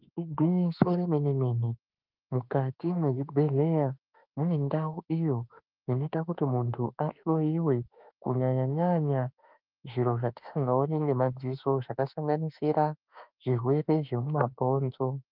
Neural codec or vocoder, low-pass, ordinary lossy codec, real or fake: autoencoder, 48 kHz, 32 numbers a frame, DAC-VAE, trained on Japanese speech; 5.4 kHz; Opus, 32 kbps; fake